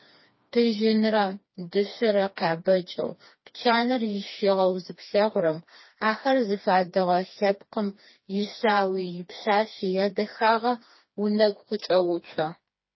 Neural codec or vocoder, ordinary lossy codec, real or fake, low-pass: codec, 16 kHz, 2 kbps, FreqCodec, smaller model; MP3, 24 kbps; fake; 7.2 kHz